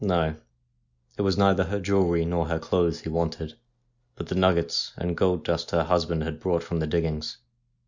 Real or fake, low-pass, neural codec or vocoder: real; 7.2 kHz; none